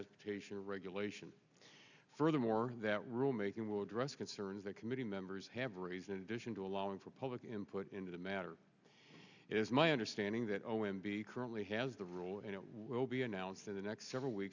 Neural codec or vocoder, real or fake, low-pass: none; real; 7.2 kHz